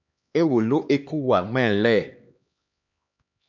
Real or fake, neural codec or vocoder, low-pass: fake; codec, 16 kHz, 2 kbps, X-Codec, HuBERT features, trained on LibriSpeech; 7.2 kHz